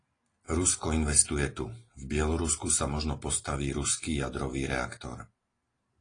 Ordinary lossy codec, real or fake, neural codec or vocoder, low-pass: AAC, 32 kbps; real; none; 9.9 kHz